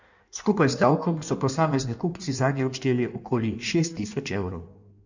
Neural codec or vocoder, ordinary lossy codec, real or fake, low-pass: codec, 16 kHz in and 24 kHz out, 1.1 kbps, FireRedTTS-2 codec; none; fake; 7.2 kHz